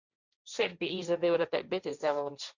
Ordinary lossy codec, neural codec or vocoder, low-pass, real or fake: Opus, 64 kbps; codec, 16 kHz, 1.1 kbps, Voila-Tokenizer; 7.2 kHz; fake